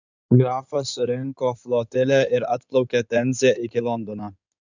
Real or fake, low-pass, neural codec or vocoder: fake; 7.2 kHz; codec, 16 kHz in and 24 kHz out, 2.2 kbps, FireRedTTS-2 codec